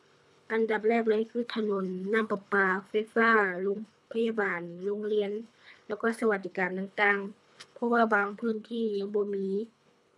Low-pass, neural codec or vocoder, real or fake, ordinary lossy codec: none; codec, 24 kHz, 3 kbps, HILCodec; fake; none